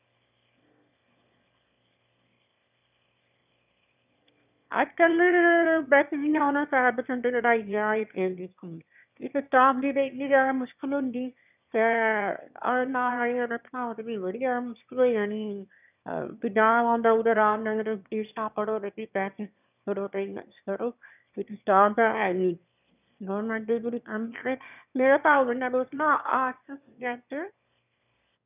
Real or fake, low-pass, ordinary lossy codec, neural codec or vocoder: fake; 3.6 kHz; none; autoencoder, 22.05 kHz, a latent of 192 numbers a frame, VITS, trained on one speaker